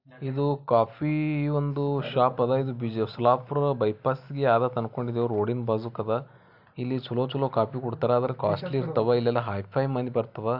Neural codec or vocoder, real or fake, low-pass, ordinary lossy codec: none; real; 5.4 kHz; AAC, 48 kbps